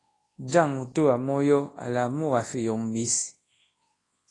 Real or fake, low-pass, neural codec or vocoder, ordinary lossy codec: fake; 10.8 kHz; codec, 24 kHz, 0.9 kbps, WavTokenizer, large speech release; AAC, 32 kbps